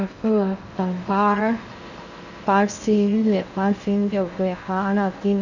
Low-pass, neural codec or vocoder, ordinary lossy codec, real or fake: 7.2 kHz; codec, 16 kHz in and 24 kHz out, 0.6 kbps, FocalCodec, streaming, 2048 codes; none; fake